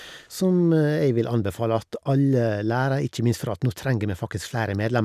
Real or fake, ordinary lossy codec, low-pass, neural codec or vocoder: real; none; 14.4 kHz; none